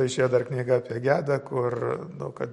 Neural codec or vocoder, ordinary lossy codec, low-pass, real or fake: none; MP3, 48 kbps; 19.8 kHz; real